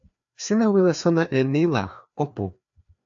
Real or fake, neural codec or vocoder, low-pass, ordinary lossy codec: fake; codec, 16 kHz, 2 kbps, FreqCodec, larger model; 7.2 kHz; AAC, 64 kbps